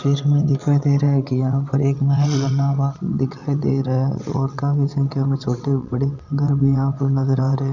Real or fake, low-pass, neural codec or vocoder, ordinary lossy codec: fake; 7.2 kHz; vocoder, 22.05 kHz, 80 mel bands, WaveNeXt; none